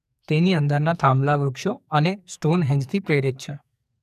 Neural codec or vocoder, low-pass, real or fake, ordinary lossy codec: codec, 44.1 kHz, 2.6 kbps, SNAC; 14.4 kHz; fake; none